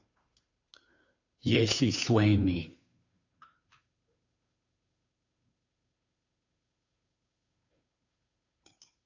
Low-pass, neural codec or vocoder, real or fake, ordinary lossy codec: 7.2 kHz; codec, 16 kHz, 2 kbps, FunCodec, trained on Chinese and English, 25 frames a second; fake; AAC, 48 kbps